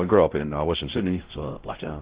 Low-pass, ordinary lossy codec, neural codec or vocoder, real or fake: 3.6 kHz; Opus, 16 kbps; codec, 16 kHz, 0.5 kbps, X-Codec, WavLM features, trained on Multilingual LibriSpeech; fake